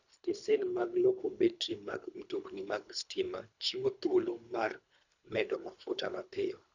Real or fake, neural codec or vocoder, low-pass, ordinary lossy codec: fake; codec, 24 kHz, 3 kbps, HILCodec; 7.2 kHz; none